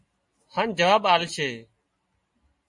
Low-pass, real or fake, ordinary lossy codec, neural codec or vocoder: 10.8 kHz; real; MP3, 48 kbps; none